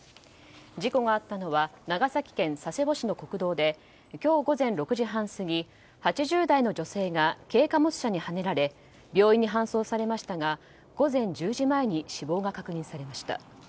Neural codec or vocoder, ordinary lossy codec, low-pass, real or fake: none; none; none; real